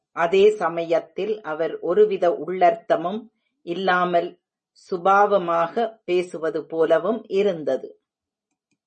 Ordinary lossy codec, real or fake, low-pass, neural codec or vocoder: MP3, 32 kbps; real; 10.8 kHz; none